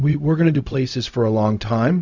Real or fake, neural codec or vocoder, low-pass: fake; codec, 16 kHz, 0.4 kbps, LongCat-Audio-Codec; 7.2 kHz